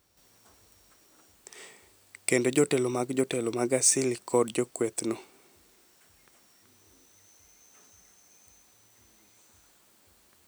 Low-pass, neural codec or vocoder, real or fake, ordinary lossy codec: none; none; real; none